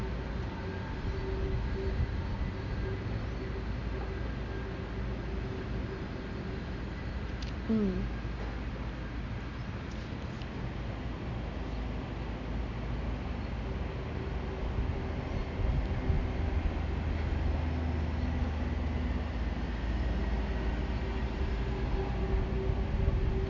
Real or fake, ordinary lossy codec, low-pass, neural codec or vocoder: real; none; 7.2 kHz; none